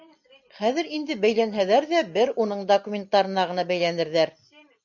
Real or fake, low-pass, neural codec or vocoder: real; 7.2 kHz; none